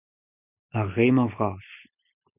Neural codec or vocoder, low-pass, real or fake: none; 3.6 kHz; real